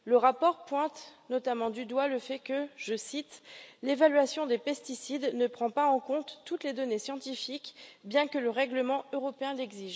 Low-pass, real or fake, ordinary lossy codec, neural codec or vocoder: none; real; none; none